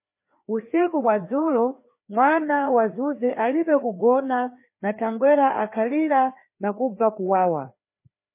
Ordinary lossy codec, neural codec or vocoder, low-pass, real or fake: MP3, 24 kbps; codec, 16 kHz, 2 kbps, FreqCodec, larger model; 3.6 kHz; fake